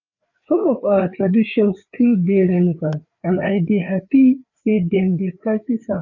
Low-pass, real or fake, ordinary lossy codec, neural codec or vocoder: 7.2 kHz; fake; none; codec, 16 kHz, 4 kbps, FreqCodec, larger model